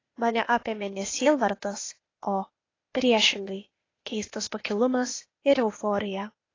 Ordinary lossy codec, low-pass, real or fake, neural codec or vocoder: AAC, 32 kbps; 7.2 kHz; fake; codec, 16 kHz, 0.8 kbps, ZipCodec